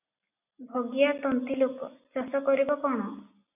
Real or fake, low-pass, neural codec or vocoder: real; 3.6 kHz; none